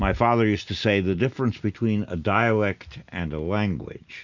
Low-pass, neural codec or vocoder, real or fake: 7.2 kHz; none; real